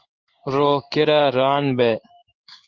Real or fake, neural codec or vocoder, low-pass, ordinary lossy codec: fake; codec, 16 kHz in and 24 kHz out, 1 kbps, XY-Tokenizer; 7.2 kHz; Opus, 24 kbps